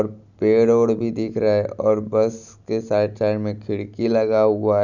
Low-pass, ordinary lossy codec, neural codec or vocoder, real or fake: 7.2 kHz; none; none; real